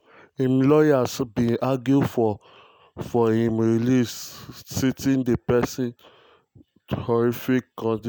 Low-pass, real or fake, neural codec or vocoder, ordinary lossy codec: none; real; none; none